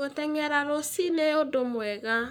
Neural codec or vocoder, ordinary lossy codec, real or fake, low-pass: codec, 44.1 kHz, 7.8 kbps, Pupu-Codec; none; fake; none